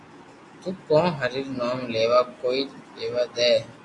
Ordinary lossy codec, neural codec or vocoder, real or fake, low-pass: AAC, 48 kbps; none; real; 10.8 kHz